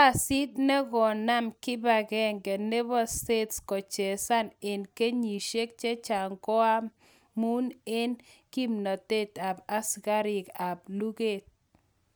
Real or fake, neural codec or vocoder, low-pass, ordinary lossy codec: real; none; none; none